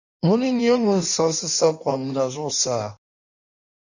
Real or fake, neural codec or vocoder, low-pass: fake; codec, 16 kHz in and 24 kHz out, 1.1 kbps, FireRedTTS-2 codec; 7.2 kHz